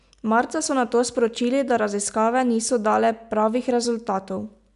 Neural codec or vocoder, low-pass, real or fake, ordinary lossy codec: none; 10.8 kHz; real; AAC, 96 kbps